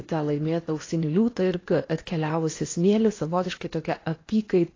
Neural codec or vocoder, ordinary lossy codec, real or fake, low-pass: codec, 16 kHz in and 24 kHz out, 0.8 kbps, FocalCodec, streaming, 65536 codes; AAC, 32 kbps; fake; 7.2 kHz